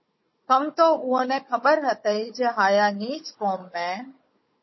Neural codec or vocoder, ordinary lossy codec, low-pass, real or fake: codec, 16 kHz, 16 kbps, FunCodec, trained on Chinese and English, 50 frames a second; MP3, 24 kbps; 7.2 kHz; fake